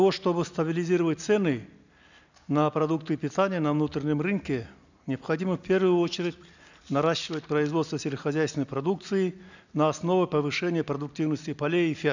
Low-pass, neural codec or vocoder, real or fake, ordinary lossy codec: 7.2 kHz; none; real; none